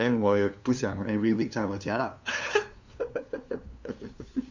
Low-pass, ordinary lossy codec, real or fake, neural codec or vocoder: 7.2 kHz; MP3, 64 kbps; fake; codec, 16 kHz, 2 kbps, FunCodec, trained on LibriTTS, 25 frames a second